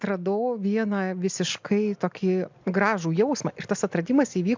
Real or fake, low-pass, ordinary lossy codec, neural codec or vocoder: real; 7.2 kHz; MP3, 64 kbps; none